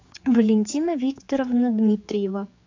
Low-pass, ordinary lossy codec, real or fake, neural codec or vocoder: 7.2 kHz; AAC, 48 kbps; fake; codec, 16 kHz, 4 kbps, X-Codec, HuBERT features, trained on general audio